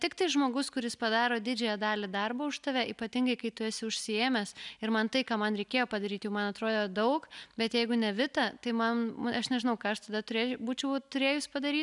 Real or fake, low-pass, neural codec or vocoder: real; 10.8 kHz; none